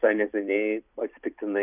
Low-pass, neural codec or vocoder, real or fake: 3.6 kHz; vocoder, 44.1 kHz, 128 mel bands every 256 samples, BigVGAN v2; fake